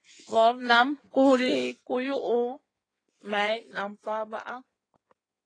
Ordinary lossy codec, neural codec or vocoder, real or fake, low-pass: AAC, 32 kbps; codec, 16 kHz in and 24 kHz out, 1.1 kbps, FireRedTTS-2 codec; fake; 9.9 kHz